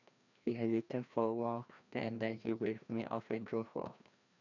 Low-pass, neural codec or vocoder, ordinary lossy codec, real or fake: 7.2 kHz; codec, 16 kHz, 1 kbps, FreqCodec, larger model; none; fake